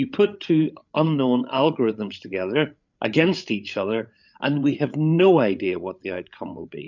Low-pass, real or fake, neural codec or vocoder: 7.2 kHz; fake; codec, 16 kHz, 8 kbps, FreqCodec, larger model